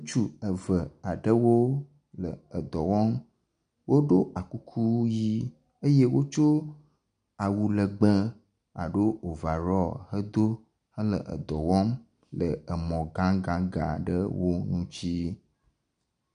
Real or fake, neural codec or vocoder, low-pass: real; none; 9.9 kHz